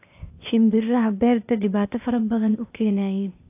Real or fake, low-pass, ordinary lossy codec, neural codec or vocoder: fake; 3.6 kHz; AAC, 32 kbps; codec, 16 kHz, 0.8 kbps, ZipCodec